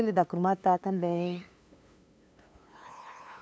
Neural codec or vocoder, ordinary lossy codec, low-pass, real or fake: codec, 16 kHz, 2 kbps, FunCodec, trained on LibriTTS, 25 frames a second; none; none; fake